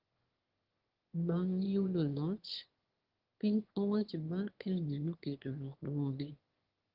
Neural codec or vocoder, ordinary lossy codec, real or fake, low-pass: autoencoder, 22.05 kHz, a latent of 192 numbers a frame, VITS, trained on one speaker; Opus, 16 kbps; fake; 5.4 kHz